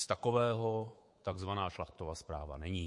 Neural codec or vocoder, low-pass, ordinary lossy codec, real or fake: codec, 24 kHz, 3.1 kbps, DualCodec; 10.8 kHz; MP3, 48 kbps; fake